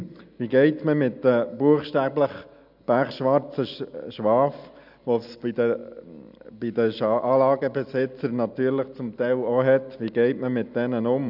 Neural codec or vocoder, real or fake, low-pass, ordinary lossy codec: none; real; 5.4 kHz; none